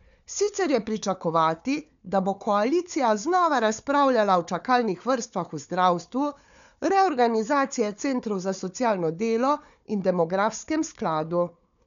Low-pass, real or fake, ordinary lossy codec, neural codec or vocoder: 7.2 kHz; fake; none; codec, 16 kHz, 4 kbps, FunCodec, trained on Chinese and English, 50 frames a second